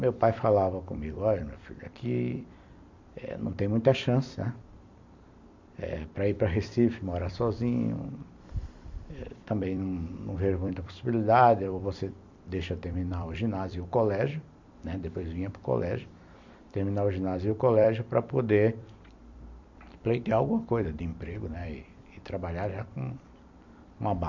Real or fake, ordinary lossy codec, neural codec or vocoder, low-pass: fake; MP3, 64 kbps; vocoder, 44.1 kHz, 128 mel bands every 256 samples, BigVGAN v2; 7.2 kHz